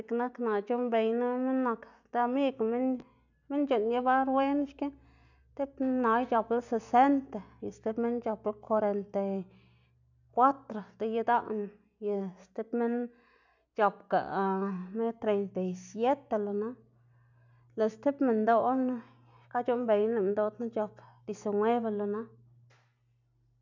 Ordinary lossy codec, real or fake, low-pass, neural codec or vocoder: none; real; 7.2 kHz; none